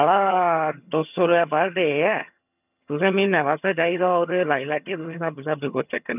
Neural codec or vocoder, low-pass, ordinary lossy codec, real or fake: vocoder, 22.05 kHz, 80 mel bands, HiFi-GAN; 3.6 kHz; none; fake